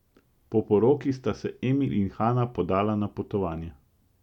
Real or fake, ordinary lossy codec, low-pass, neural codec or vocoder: real; none; 19.8 kHz; none